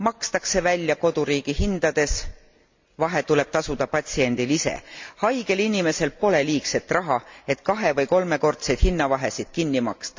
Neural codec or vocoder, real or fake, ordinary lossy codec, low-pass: none; real; MP3, 64 kbps; 7.2 kHz